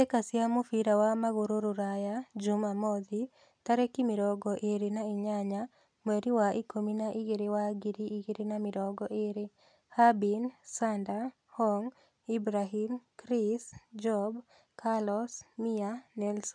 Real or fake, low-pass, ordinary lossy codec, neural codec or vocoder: real; 9.9 kHz; none; none